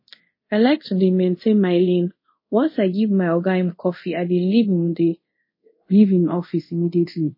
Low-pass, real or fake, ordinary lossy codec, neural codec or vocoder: 5.4 kHz; fake; MP3, 24 kbps; codec, 24 kHz, 0.5 kbps, DualCodec